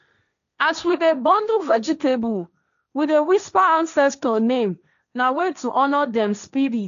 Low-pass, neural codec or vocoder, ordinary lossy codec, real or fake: 7.2 kHz; codec, 16 kHz, 1.1 kbps, Voila-Tokenizer; none; fake